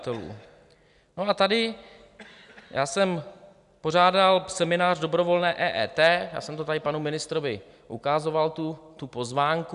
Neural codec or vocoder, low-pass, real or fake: none; 10.8 kHz; real